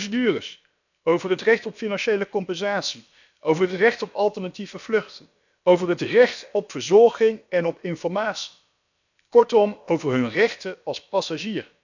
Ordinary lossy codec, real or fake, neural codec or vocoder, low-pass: none; fake; codec, 16 kHz, about 1 kbps, DyCAST, with the encoder's durations; 7.2 kHz